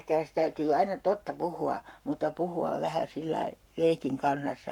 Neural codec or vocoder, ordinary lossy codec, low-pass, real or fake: codec, 44.1 kHz, 7.8 kbps, Pupu-Codec; none; 19.8 kHz; fake